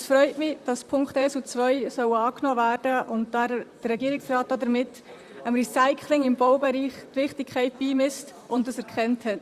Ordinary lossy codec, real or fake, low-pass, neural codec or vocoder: Opus, 64 kbps; fake; 14.4 kHz; vocoder, 44.1 kHz, 128 mel bands, Pupu-Vocoder